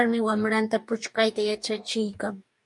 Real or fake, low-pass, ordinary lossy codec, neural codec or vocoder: fake; 10.8 kHz; MP3, 64 kbps; codec, 44.1 kHz, 2.6 kbps, DAC